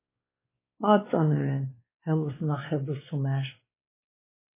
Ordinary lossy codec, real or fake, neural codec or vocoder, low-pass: MP3, 16 kbps; fake; codec, 16 kHz, 2 kbps, X-Codec, WavLM features, trained on Multilingual LibriSpeech; 3.6 kHz